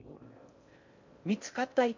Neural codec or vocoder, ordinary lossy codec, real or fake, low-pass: codec, 16 kHz in and 24 kHz out, 0.6 kbps, FocalCodec, streaming, 4096 codes; MP3, 64 kbps; fake; 7.2 kHz